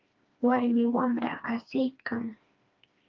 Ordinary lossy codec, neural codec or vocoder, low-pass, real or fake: Opus, 24 kbps; codec, 16 kHz, 2 kbps, FreqCodec, smaller model; 7.2 kHz; fake